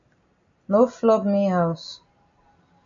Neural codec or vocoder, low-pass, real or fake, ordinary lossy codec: none; 7.2 kHz; real; AAC, 64 kbps